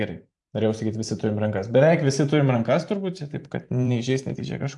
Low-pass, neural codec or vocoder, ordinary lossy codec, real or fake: 10.8 kHz; vocoder, 44.1 kHz, 128 mel bands every 512 samples, BigVGAN v2; AAC, 64 kbps; fake